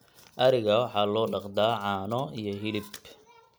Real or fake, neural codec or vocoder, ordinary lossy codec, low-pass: real; none; none; none